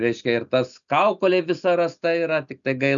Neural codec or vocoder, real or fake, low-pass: none; real; 7.2 kHz